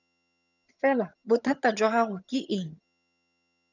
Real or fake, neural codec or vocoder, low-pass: fake; vocoder, 22.05 kHz, 80 mel bands, HiFi-GAN; 7.2 kHz